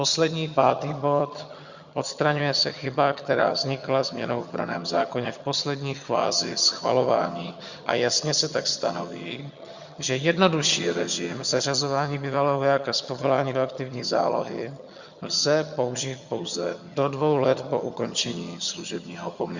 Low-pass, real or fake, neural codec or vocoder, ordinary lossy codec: 7.2 kHz; fake; vocoder, 22.05 kHz, 80 mel bands, HiFi-GAN; Opus, 64 kbps